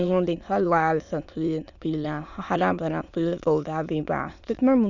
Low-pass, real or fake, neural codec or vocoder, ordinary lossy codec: 7.2 kHz; fake; autoencoder, 22.05 kHz, a latent of 192 numbers a frame, VITS, trained on many speakers; none